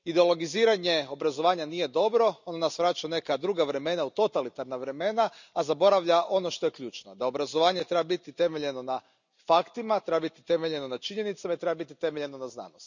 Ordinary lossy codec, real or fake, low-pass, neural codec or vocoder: none; real; 7.2 kHz; none